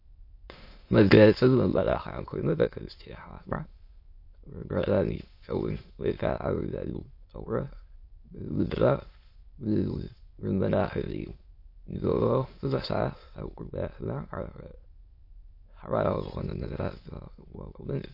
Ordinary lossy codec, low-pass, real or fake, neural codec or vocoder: MP3, 32 kbps; 5.4 kHz; fake; autoencoder, 22.05 kHz, a latent of 192 numbers a frame, VITS, trained on many speakers